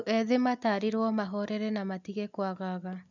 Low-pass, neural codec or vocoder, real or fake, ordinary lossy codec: 7.2 kHz; none; real; none